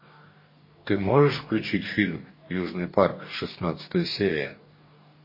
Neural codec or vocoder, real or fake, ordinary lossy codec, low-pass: codec, 44.1 kHz, 2.6 kbps, DAC; fake; MP3, 24 kbps; 5.4 kHz